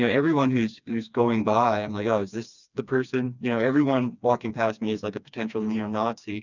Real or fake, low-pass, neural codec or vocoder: fake; 7.2 kHz; codec, 16 kHz, 2 kbps, FreqCodec, smaller model